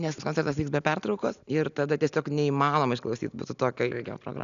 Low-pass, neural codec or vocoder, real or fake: 7.2 kHz; none; real